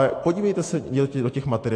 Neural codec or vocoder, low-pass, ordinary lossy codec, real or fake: none; 9.9 kHz; AAC, 48 kbps; real